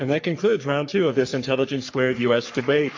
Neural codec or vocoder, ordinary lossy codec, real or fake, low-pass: codec, 44.1 kHz, 3.4 kbps, Pupu-Codec; AAC, 48 kbps; fake; 7.2 kHz